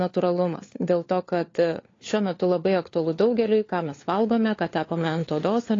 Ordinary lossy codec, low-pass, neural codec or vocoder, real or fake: AAC, 32 kbps; 7.2 kHz; codec, 16 kHz, 4 kbps, FunCodec, trained on LibriTTS, 50 frames a second; fake